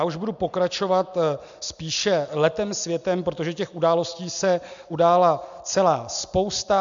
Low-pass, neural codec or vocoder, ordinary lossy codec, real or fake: 7.2 kHz; none; MP3, 64 kbps; real